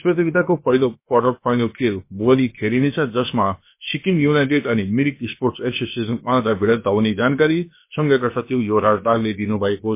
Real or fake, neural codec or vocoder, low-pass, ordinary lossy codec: fake; codec, 16 kHz, about 1 kbps, DyCAST, with the encoder's durations; 3.6 kHz; MP3, 24 kbps